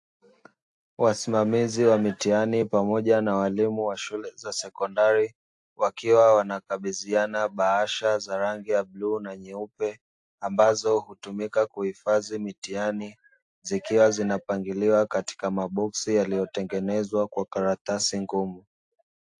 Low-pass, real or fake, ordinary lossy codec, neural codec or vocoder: 10.8 kHz; real; AAC, 64 kbps; none